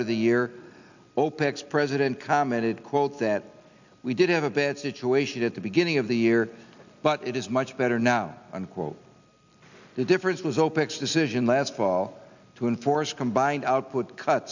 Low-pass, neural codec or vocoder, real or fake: 7.2 kHz; none; real